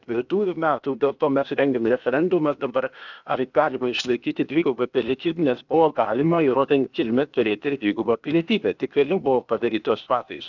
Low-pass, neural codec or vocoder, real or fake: 7.2 kHz; codec, 16 kHz, 0.8 kbps, ZipCodec; fake